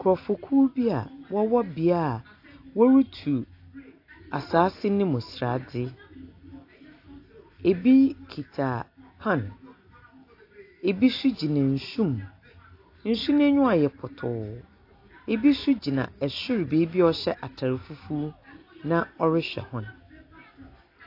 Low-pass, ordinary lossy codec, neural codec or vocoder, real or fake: 5.4 kHz; AAC, 32 kbps; none; real